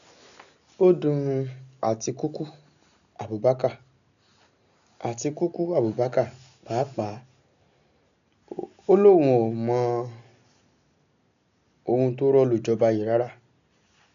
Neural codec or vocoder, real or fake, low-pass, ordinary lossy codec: none; real; 7.2 kHz; none